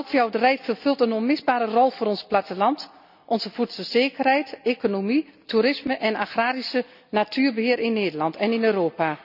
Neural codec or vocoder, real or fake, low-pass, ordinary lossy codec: none; real; 5.4 kHz; none